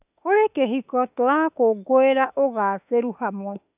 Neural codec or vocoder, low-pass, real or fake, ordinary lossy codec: autoencoder, 48 kHz, 32 numbers a frame, DAC-VAE, trained on Japanese speech; 3.6 kHz; fake; none